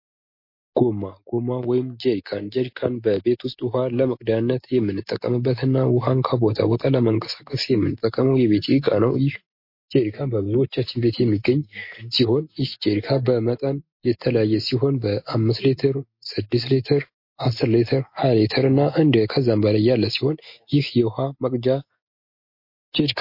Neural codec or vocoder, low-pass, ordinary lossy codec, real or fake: none; 5.4 kHz; MP3, 32 kbps; real